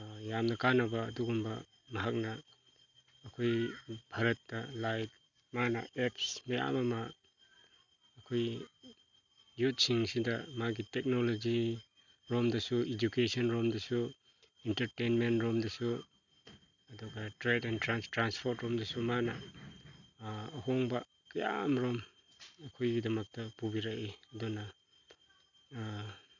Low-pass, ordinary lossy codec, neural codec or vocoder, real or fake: 7.2 kHz; none; none; real